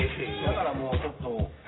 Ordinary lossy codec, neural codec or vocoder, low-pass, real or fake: AAC, 16 kbps; none; 7.2 kHz; real